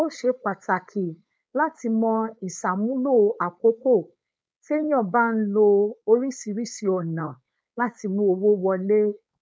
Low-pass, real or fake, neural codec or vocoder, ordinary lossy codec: none; fake; codec, 16 kHz, 4.8 kbps, FACodec; none